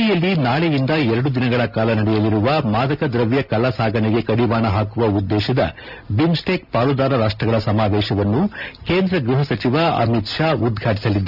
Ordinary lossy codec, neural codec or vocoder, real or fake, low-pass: none; none; real; 5.4 kHz